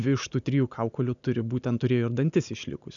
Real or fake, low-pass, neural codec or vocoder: real; 7.2 kHz; none